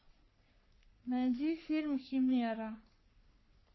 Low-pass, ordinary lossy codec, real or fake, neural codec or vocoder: 7.2 kHz; MP3, 24 kbps; fake; codec, 44.1 kHz, 3.4 kbps, Pupu-Codec